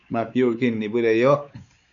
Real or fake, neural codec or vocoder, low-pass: fake; codec, 16 kHz, 4 kbps, X-Codec, WavLM features, trained on Multilingual LibriSpeech; 7.2 kHz